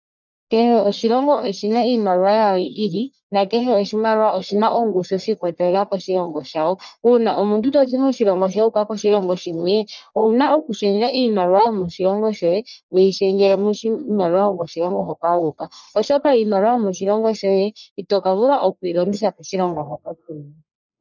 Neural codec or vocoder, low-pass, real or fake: codec, 44.1 kHz, 1.7 kbps, Pupu-Codec; 7.2 kHz; fake